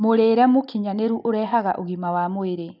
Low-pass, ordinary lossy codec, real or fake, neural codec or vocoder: 5.4 kHz; none; real; none